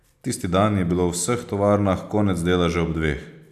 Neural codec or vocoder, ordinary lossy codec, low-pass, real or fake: none; none; 14.4 kHz; real